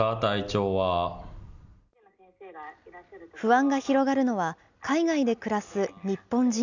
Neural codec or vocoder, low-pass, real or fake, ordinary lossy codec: none; 7.2 kHz; real; none